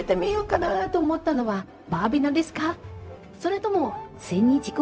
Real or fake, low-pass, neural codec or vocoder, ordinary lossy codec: fake; none; codec, 16 kHz, 0.4 kbps, LongCat-Audio-Codec; none